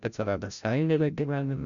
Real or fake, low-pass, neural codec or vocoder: fake; 7.2 kHz; codec, 16 kHz, 0.5 kbps, FreqCodec, larger model